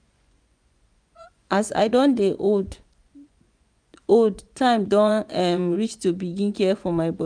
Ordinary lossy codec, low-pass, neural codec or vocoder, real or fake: none; 9.9 kHz; vocoder, 24 kHz, 100 mel bands, Vocos; fake